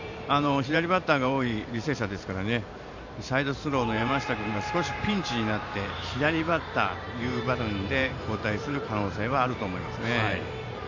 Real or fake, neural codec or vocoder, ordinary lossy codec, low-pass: fake; vocoder, 44.1 kHz, 128 mel bands every 512 samples, BigVGAN v2; none; 7.2 kHz